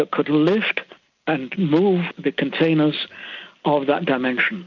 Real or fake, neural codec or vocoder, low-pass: real; none; 7.2 kHz